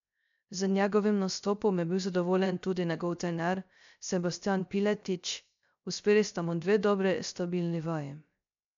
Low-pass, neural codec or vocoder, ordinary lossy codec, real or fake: 7.2 kHz; codec, 16 kHz, 0.3 kbps, FocalCodec; MP3, 64 kbps; fake